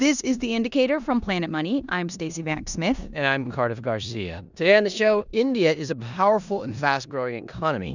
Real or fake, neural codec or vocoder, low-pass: fake; codec, 16 kHz in and 24 kHz out, 0.9 kbps, LongCat-Audio-Codec, four codebook decoder; 7.2 kHz